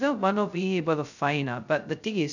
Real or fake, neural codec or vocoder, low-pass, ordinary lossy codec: fake; codec, 16 kHz, 0.2 kbps, FocalCodec; 7.2 kHz; none